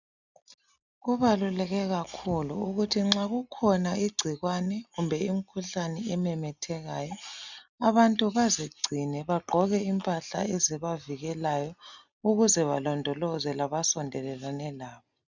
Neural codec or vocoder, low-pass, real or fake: none; 7.2 kHz; real